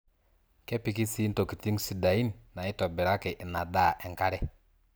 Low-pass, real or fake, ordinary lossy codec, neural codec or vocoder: none; real; none; none